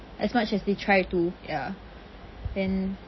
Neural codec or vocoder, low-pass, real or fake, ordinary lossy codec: none; 7.2 kHz; real; MP3, 24 kbps